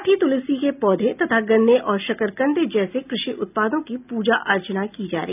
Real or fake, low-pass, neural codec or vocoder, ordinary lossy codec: real; 3.6 kHz; none; none